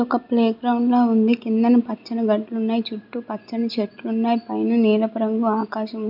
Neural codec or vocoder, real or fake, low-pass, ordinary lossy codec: none; real; 5.4 kHz; none